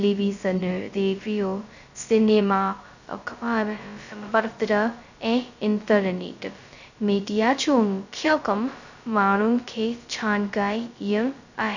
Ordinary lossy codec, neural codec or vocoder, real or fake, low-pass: none; codec, 16 kHz, 0.2 kbps, FocalCodec; fake; 7.2 kHz